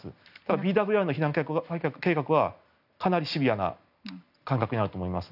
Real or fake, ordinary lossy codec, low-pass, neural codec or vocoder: real; none; 5.4 kHz; none